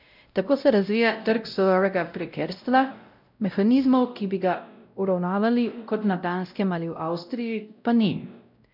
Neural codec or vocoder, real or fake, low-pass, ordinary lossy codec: codec, 16 kHz, 0.5 kbps, X-Codec, WavLM features, trained on Multilingual LibriSpeech; fake; 5.4 kHz; none